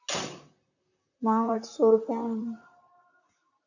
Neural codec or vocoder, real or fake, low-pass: vocoder, 44.1 kHz, 128 mel bands, Pupu-Vocoder; fake; 7.2 kHz